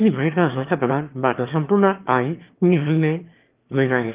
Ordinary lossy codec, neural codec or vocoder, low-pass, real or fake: Opus, 24 kbps; autoencoder, 22.05 kHz, a latent of 192 numbers a frame, VITS, trained on one speaker; 3.6 kHz; fake